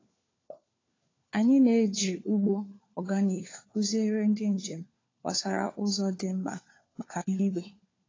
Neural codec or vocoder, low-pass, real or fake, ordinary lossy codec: codec, 16 kHz, 4 kbps, FunCodec, trained on LibriTTS, 50 frames a second; 7.2 kHz; fake; AAC, 32 kbps